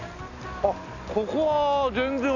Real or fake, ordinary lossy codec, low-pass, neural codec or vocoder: real; none; 7.2 kHz; none